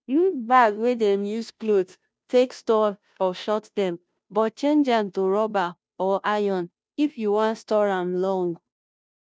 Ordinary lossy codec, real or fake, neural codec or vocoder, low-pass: none; fake; codec, 16 kHz, 0.5 kbps, FunCodec, trained on Chinese and English, 25 frames a second; none